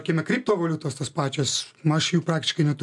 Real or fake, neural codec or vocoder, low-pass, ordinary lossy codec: real; none; 10.8 kHz; MP3, 64 kbps